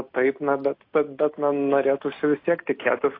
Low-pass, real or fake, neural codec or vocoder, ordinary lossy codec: 5.4 kHz; real; none; AAC, 32 kbps